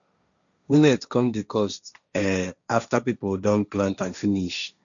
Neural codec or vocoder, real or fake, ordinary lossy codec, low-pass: codec, 16 kHz, 1.1 kbps, Voila-Tokenizer; fake; none; 7.2 kHz